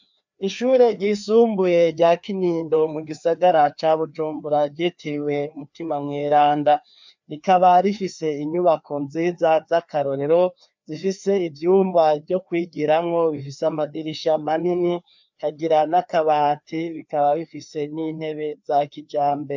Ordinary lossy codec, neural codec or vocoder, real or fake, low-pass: MP3, 64 kbps; codec, 16 kHz, 2 kbps, FreqCodec, larger model; fake; 7.2 kHz